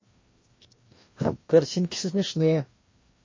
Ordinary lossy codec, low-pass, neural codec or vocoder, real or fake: MP3, 32 kbps; 7.2 kHz; codec, 16 kHz, 1 kbps, FreqCodec, larger model; fake